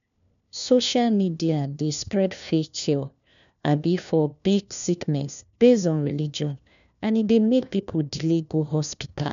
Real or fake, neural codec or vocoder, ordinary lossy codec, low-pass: fake; codec, 16 kHz, 1 kbps, FunCodec, trained on LibriTTS, 50 frames a second; none; 7.2 kHz